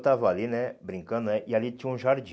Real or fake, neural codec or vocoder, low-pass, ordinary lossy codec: real; none; none; none